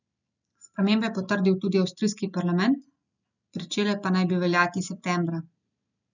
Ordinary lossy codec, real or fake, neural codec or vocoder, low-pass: none; real; none; 7.2 kHz